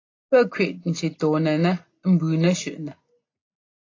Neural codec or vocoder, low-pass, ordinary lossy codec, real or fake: none; 7.2 kHz; AAC, 32 kbps; real